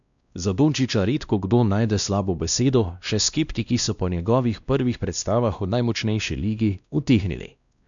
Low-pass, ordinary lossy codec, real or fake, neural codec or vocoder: 7.2 kHz; none; fake; codec, 16 kHz, 1 kbps, X-Codec, WavLM features, trained on Multilingual LibriSpeech